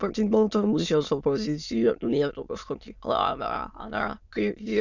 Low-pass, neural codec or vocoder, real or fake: 7.2 kHz; autoencoder, 22.05 kHz, a latent of 192 numbers a frame, VITS, trained on many speakers; fake